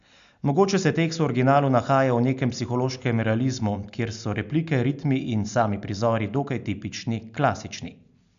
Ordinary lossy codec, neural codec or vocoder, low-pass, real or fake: AAC, 96 kbps; none; 7.2 kHz; real